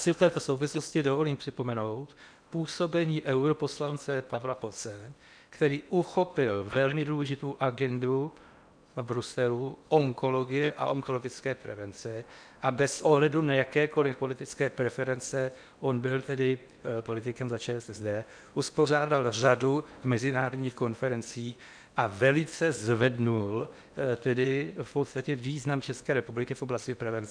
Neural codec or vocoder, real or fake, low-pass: codec, 16 kHz in and 24 kHz out, 0.8 kbps, FocalCodec, streaming, 65536 codes; fake; 9.9 kHz